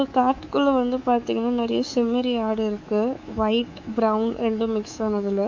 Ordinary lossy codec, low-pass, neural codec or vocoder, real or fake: MP3, 64 kbps; 7.2 kHz; codec, 24 kHz, 3.1 kbps, DualCodec; fake